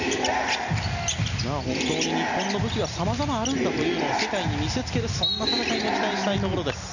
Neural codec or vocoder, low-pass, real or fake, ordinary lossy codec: none; 7.2 kHz; real; none